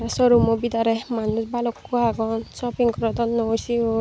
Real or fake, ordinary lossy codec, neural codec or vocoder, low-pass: real; none; none; none